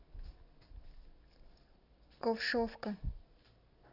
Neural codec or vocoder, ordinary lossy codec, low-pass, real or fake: none; none; 5.4 kHz; real